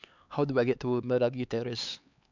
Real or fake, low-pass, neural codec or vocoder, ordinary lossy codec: fake; 7.2 kHz; codec, 16 kHz, 4 kbps, X-Codec, HuBERT features, trained on LibriSpeech; none